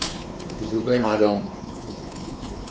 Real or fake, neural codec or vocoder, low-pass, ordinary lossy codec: fake; codec, 16 kHz, 4 kbps, X-Codec, WavLM features, trained on Multilingual LibriSpeech; none; none